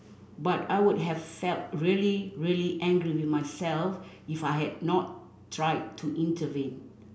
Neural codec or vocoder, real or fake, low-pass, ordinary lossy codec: none; real; none; none